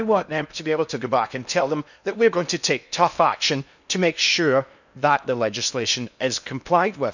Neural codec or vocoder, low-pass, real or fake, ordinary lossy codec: codec, 16 kHz in and 24 kHz out, 0.8 kbps, FocalCodec, streaming, 65536 codes; 7.2 kHz; fake; none